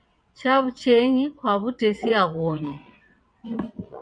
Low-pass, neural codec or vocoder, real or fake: 9.9 kHz; vocoder, 22.05 kHz, 80 mel bands, WaveNeXt; fake